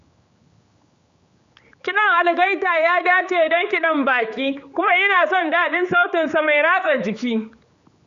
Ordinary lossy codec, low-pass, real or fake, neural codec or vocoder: Opus, 64 kbps; 7.2 kHz; fake; codec, 16 kHz, 4 kbps, X-Codec, HuBERT features, trained on general audio